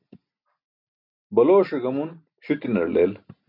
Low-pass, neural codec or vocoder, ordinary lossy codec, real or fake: 5.4 kHz; none; MP3, 48 kbps; real